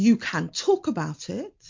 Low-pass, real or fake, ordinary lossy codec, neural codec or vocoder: 7.2 kHz; real; MP3, 48 kbps; none